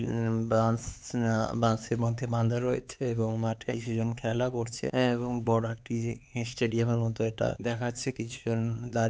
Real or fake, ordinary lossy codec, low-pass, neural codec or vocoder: fake; none; none; codec, 16 kHz, 4 kbps, X-Codec, HuBERT features, trained on LibriSpeech